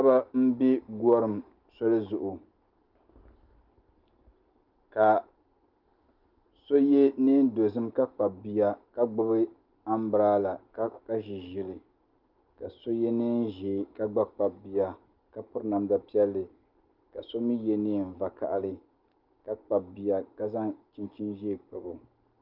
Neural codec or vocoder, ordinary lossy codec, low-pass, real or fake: none; Opus, 24 kbps; 5.4 kHz; real